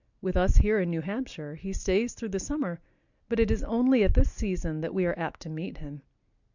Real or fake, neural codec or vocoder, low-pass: real; none; 7.2 kHz